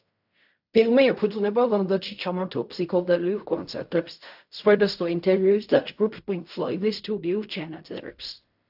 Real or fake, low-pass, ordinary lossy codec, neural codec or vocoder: fake; 5.4 kHz; AAC, 48 kbps; codec, 16 kHz in and 24 kHz out, 0.4 kbps, LongCat-Audio-Codec, fine tuned four codebook decoder